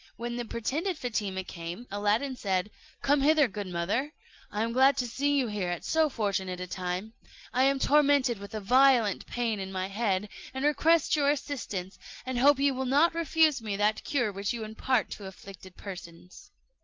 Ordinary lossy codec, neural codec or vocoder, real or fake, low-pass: Opus, 32 kbps; none; real; 7.2 kHz